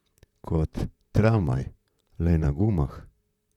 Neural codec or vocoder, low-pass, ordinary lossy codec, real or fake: vocoder, 44.1 kHz, 128 mel bands, Pupu-Vocoder; 19.8 kHz; none; fake